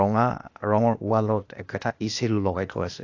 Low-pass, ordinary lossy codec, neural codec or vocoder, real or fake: 7.2 kHz; AAC, 48 kbps; codec, 16 kHz, 0.8 kbps, ZipCodec; fake